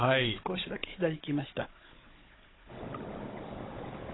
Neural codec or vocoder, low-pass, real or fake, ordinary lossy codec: codec, 16 kHz, 16 kbps, FunCodec, trained on Chinese and English, 50 frames a second; 7.2 kHz; fake; AAC, 16 kbps